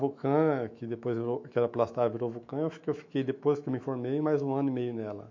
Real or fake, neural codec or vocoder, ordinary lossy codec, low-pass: fake; autoencoder, 48 kHz, 128 numbers a frame, DAC-VAE, trained on Japanese speech; MP3, 48 kbps; 7.2 kHz